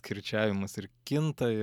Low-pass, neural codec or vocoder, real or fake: 19.8 kHz; none; real